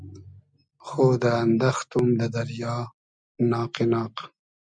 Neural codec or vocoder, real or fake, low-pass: none; real; 9.9 kHz